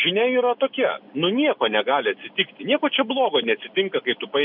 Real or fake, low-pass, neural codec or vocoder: real; 5.4 kHz; none